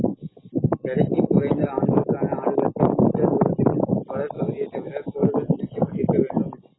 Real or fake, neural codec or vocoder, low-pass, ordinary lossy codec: real; none; 7.2 kHz; AAC, 16 kbps